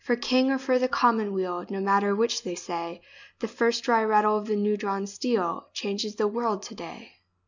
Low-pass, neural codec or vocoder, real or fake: 7.2 kHz; none; real